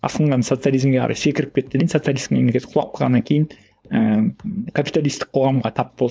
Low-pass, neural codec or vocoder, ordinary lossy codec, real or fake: none; codec, 16 kHz, 4.8 kbps, FACodec; none; fake